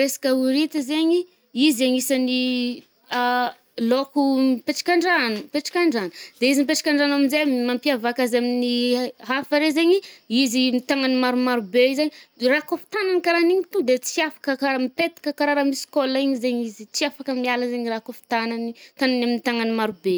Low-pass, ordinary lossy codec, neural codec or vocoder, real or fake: none; none; none; real